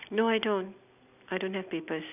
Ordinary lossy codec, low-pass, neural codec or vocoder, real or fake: none; 3.6 kHz; none; real